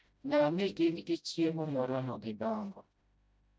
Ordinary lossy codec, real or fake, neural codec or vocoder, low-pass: none; fake; codec, 16 kHz, 0.5 kbps, FreqCodec, smaller model; none